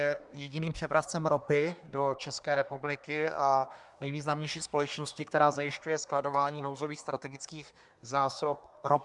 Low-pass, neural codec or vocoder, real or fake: 10.8 kHz; codec, 24 kHz, 1 kbps, SNAC; fake